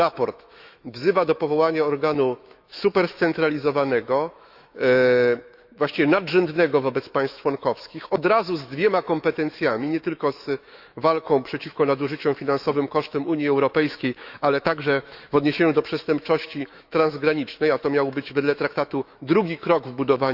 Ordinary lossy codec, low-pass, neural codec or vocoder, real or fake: Opus, 64 kbps; 5.4 kHz; autoencoder, 48 kHz, 128 numbers a frame, DAC-VAE, trained on Japanese speech; fake